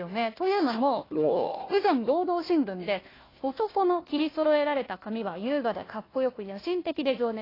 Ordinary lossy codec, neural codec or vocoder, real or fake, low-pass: AAC, 24 kbps; codec, 16 kHz, 1 kbps, FunCodec, trained on Chinese and English, 50 frames a second; fake; 5.4 kHz